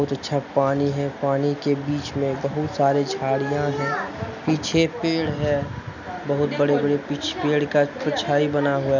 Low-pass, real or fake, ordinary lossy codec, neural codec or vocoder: 7.2 kHz; real; none; none